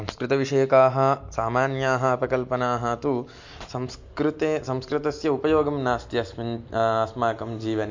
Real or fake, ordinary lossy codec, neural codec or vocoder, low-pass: real; MP3, 48 kbps; none; 7.2 kHz